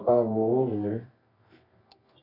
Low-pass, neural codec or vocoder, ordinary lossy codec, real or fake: 5.4 kHz; codec, 24 kHz, 0.9 kbps, WavTokenizer, medium music audio release; AAC, 24 kbps; fake